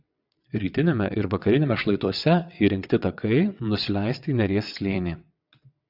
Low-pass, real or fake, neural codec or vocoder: 5.4 kHz; fake; vocoder, 22.05 kHz, 80 mel bands, WaveNeXt